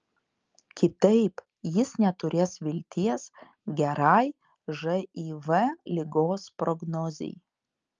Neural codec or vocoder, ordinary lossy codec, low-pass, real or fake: none; Opus, 24 kbps; 7.2 kHz; real